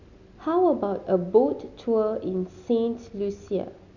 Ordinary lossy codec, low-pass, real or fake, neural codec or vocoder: none; 7.2 kHz; real; none